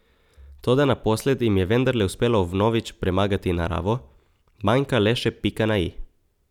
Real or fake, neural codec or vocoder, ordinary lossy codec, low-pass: real; none; none; 19.8 kHz